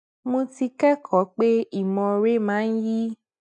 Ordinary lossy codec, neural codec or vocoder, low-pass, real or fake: none; none; 10.8 kHz; real